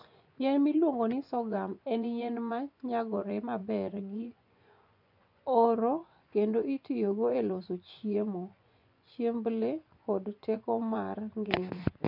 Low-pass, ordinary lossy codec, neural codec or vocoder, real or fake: 5.4 kHz; none; vocoder, 24 kHz, 100 mel bands, Vocos; fake